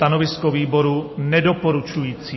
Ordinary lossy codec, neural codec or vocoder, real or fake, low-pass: MP3, 24 kbps; none; real; 7.2 kHz